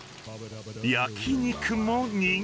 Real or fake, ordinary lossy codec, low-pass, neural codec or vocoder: real; none; none; none